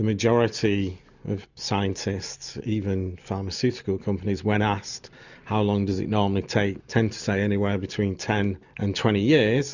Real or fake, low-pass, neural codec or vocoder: real; 7.2 kHz; none